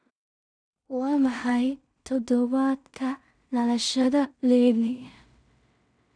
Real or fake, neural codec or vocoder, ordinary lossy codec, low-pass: fake; codec, 16 kHz in and 24 kHz out, 0.4 kbps, LongCat-Audio-Codec, two codebook decoder; none; 9.9 kHz